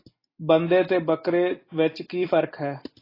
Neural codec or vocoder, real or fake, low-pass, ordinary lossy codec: none; real; 5.4 kHz; AAC, 24 kbps